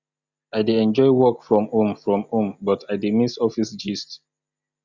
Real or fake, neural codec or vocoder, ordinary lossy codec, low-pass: real; none; Opus, 64 kbps; 7.2 kHz